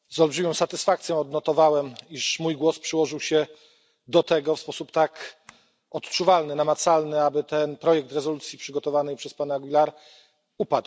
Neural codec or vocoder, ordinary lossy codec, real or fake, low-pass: none; none; real; none